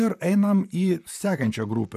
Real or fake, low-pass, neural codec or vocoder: fake; 14.4 kHz; vocoder, 44.1 kHz, 128 mel bands, Pupu-Vocoder